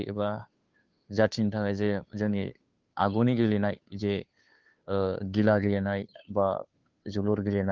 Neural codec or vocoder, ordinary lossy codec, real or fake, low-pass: codec, 16 kHz, 2 kbps, FunCodec, trained on Chinese and English, 25 frames a second; Opus, 32 kbps; fake; 7.2 kHz